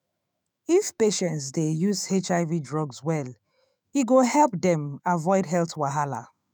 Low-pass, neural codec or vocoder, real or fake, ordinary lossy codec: none; autoencoder, 48 kHz, 128 numbers a frame, DAC-VAE, trained on Japanese speech; fake; none